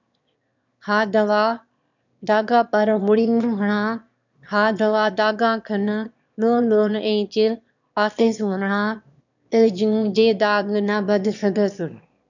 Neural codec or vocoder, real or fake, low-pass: autoencoder, 22.05 kHz, a latent of 192 numbers a frame, VITS, trained on one speaker; fake; 7.2 kHz